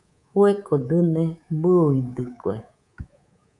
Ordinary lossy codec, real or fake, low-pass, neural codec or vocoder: AAC, 64 kbps; fake; 10.8 kHz; codec, 24 kHz, 3.1 kbps, DualCodec